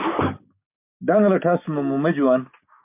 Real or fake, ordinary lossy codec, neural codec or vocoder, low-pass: fake; MP3, 32 kbps; codec, 16 kHz, 6 kbps, DAC; 3.6 kHz